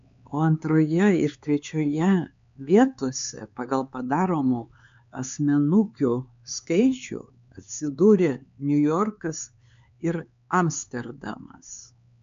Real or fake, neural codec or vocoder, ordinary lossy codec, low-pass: fake; codec, 16 kHz, 4 kbps, X-Codec, HuBERT features, trained on LibriSpeech; MP3, 64 kbps; 7.2 kHz